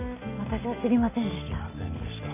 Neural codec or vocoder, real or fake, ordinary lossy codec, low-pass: none; real; none; 3.6 kHz